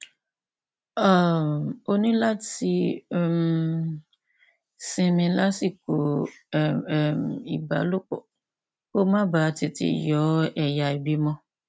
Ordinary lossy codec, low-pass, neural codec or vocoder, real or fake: none; none; none; real